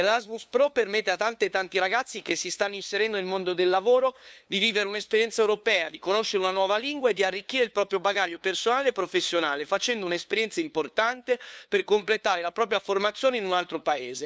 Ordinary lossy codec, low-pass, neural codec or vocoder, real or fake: none; none; codec, 16 kHz, 2 kbps, FunCodec, trained on LibriTTS, 25 frames a second; fake